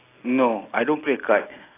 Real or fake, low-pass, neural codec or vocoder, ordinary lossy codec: real; 3.6 kHz; none; AAC, 16 kbps